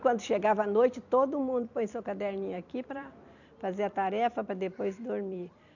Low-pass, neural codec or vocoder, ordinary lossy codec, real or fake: 7.2 kHz; vocoder, 44.1 kHz, 128 mel bands every 256 samples, BigVGAN v2; none; fake